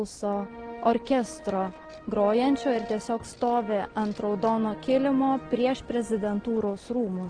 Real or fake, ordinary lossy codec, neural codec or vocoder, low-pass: real; Opus, 16 kbps; none; 9.9 kHz